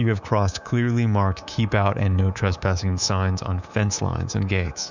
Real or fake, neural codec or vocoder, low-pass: fake; codec, 24 kHz, 3.1 kbps, DualCodec; 7.2 kHz